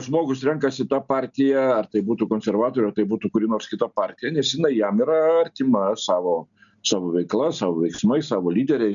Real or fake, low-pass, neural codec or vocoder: real; 7.2 kHz; none